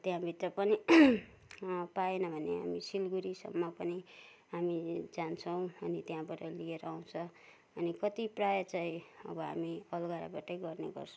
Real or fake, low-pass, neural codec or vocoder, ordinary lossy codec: real; none; none; none